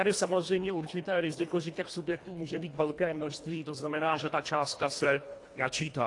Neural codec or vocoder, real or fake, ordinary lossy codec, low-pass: codec, 24 kHz, 1.5 kbps, HILCodec; fake; AAC, 48 kbps; 10.8 kHz